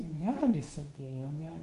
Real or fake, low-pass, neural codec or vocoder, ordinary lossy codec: fake; 10.8 kHz; codec, 24 kHz, 0.9 kbps, WavTokenizer, medium speech release version 2; MP3, 48 kbps